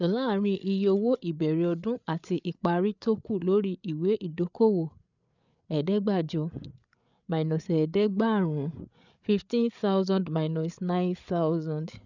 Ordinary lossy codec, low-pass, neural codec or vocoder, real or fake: none; 7.2 kHz; codec, 16 kHz, 8 kbps, FreqCodec, larger model; fake